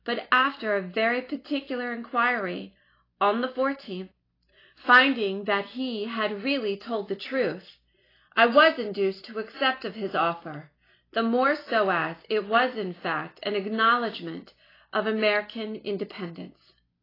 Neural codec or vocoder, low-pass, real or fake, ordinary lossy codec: none; 5.4 kHz; real; AAC, 24 kbps